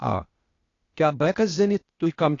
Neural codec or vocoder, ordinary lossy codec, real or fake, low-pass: codec, 16 kHz, 0.8 kbps, ZipCodec; AAC, 32 kbps; fake; 7.2 kHz